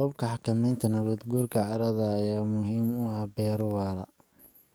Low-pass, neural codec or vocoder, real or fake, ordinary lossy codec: none; codec, 44.1 kHz, 7.8 kbps, DAC; fake; none